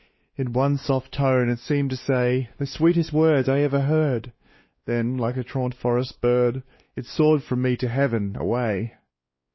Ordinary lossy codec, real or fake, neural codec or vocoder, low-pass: MP3, 24 kbps; fake; codec, 16 kHz, 2 kbps, X-Codec, WavLM features, trained on Multilingual LibriSpeech; 7.2 kHz